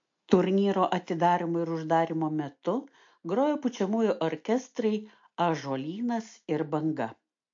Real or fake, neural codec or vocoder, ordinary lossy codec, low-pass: real; none; MP3, 48 kbps; 7.2 kHz